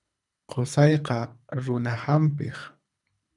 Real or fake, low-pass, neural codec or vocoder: fake; 10.8 kHz; codec, 24 kHz, 3 kbps, HILCodec